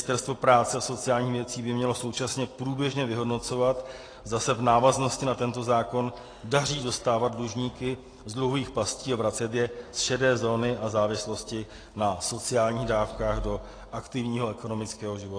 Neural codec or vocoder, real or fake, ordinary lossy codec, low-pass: none; real; AAC, 32 kbps; 9.9 kHz